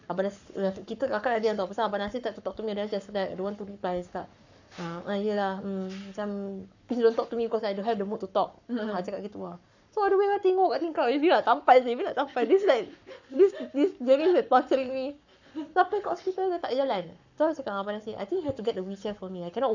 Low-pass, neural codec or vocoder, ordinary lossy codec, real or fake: 7.2 kHz; codec, 44.1 kHz, 7.8 kbps, Pupu-Codec; none; fake